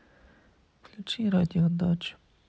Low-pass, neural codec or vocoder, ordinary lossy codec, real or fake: none; none; none; real